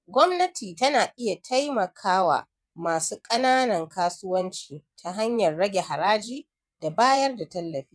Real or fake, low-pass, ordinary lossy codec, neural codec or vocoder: fake; none; none; vocoder, 22.05 kHz, 80 mel bands, WaveNeXt